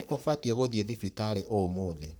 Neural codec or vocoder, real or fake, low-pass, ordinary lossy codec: codec, 44.1 kHz, 3.4 kbps, Pupu-Codec; fake; none; none